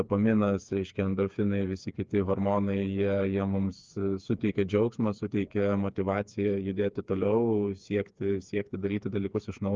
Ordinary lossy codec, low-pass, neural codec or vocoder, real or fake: Opus, 24 kbps; 7.2 kHz; codec, 16 kHz, 4 kbps, FreqCodec, smaller model; fake